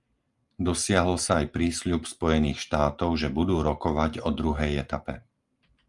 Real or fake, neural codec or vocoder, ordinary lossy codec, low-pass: real; none; Opus, 32 kbps; 10.8 kHz